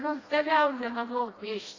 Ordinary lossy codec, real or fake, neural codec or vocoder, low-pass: AAC, 32 kbps; fake; codec, 16 kHz, 1 kbps, FreqCodec, smaller model; 7.2 kHz